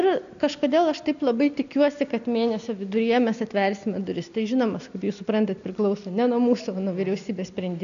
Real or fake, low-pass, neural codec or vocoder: real; 7.2 kHz; none